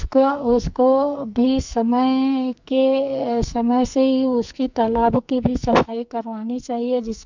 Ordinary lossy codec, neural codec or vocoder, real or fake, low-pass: MP3, 64 kbps; codec, 44.1 kHz, 2.6 kbps, SNAC; fake; 7.2 kHz